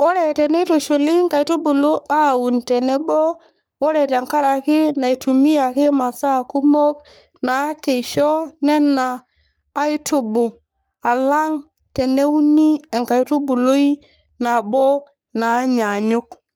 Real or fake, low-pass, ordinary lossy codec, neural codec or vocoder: fake; none; none; codec, 44.1 kHz, 3.4 kbps, Pupu-Codec